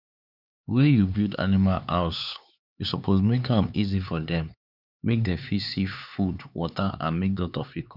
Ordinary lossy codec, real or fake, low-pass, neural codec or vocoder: none; fake; 5.4 kHz; codec, 16 kHz, 4 kbps, X-Codec, HuBERT features, trained on LibriSpeech